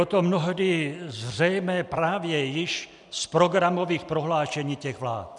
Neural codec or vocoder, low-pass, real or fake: none; 10.8 kHz; real